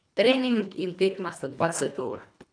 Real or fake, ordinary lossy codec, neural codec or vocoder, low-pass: fake; none; codec, 24 kHz, 1.5 kbps, HILCodec; 9.9 kHz